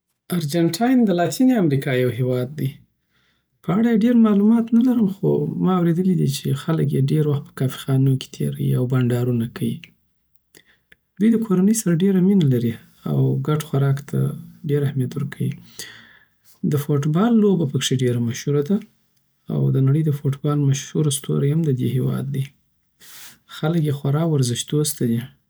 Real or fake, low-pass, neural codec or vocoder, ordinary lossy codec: real; none; none; none